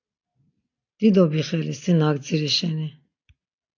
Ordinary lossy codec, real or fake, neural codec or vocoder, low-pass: AAC, 48 kbps; real; none; 7.2 kHz